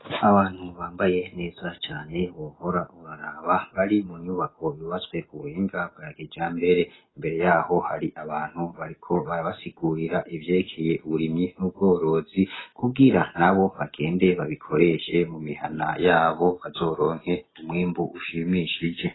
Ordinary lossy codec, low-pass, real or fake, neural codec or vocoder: AAC, 16 kbps; 7.2 kHz; real; none